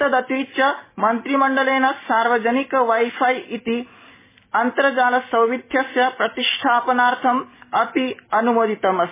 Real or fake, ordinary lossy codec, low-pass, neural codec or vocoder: real; MP3, 16 kbps; 3.6 kHz; none